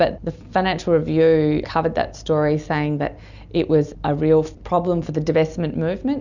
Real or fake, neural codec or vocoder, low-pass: real; none; 7.2 kHz